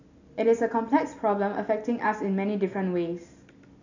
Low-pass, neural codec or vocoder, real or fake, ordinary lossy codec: 7.2 kHz; none; real; none